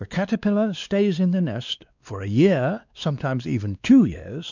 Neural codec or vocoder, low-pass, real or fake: codec, 16 kHz, 2 kbps, X-Codec, WavLM features, trained on Multilingual LibriSpeech; 7.2 kHz; fake